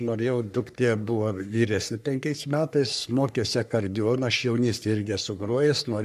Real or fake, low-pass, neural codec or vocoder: fake; 14.4 kHz; codec, 44.1 kHz, 3.4 kbps, Pupu-Codec